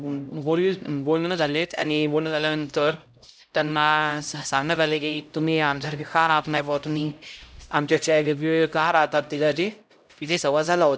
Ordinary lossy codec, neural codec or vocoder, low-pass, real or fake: none; codec, 16 kHz, 0.5 kbps, X-Codec, HuBERT features, trained on LibriSpeech; none; fake